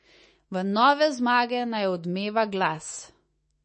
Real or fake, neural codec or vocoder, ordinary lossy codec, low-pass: fake; autoencoder, 48 kHz, 128 numbers a frame, DAC-VAE, trained on Japanese speech; MP3, 32 kbps; 10.8 kHz